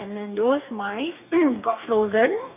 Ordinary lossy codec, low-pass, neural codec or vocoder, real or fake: none; 3.6 kHz; codec, 44.1 kHz, 2.6 kbps, DAC; fake